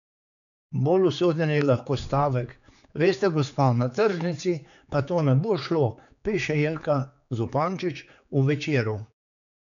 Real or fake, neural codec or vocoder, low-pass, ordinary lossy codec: fake; codec, 16 kHz, 4 kbps, X-Codec, HuBERT features, trained on general audio; 7.2 kHz; none